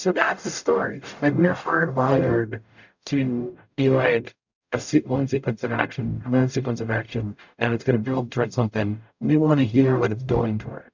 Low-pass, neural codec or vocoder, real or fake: 7.2 kHz; codec, 44.1 kHz, 0.9 kbps, DAC; fake